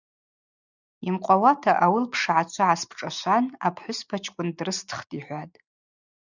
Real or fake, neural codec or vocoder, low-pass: real; none; 7.2 kHz